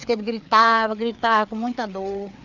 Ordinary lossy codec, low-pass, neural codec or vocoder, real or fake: none; 7.2 kHz; codec, 16 kHz, 4 kbps, FreqCodec, larger model; fake